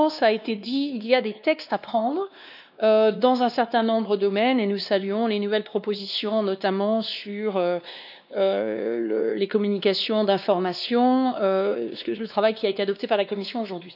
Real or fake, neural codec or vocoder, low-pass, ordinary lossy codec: fake; codec, 16 kHz, 2 kbps, X-Codec, WavLM features, trained on Multilingual LibriSpeech; 5.4 kHz; none